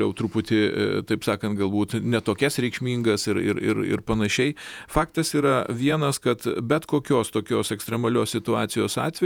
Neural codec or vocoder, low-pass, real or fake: vocoder, 48 kHz, 128 mel bands, Vocos; 19.8 kHz; fake